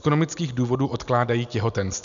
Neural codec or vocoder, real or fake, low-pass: none; real; 7.2 kHz